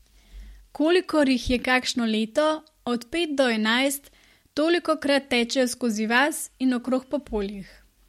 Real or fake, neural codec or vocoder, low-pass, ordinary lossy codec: real; none; 19.8 kHz; MP3, 64 kbps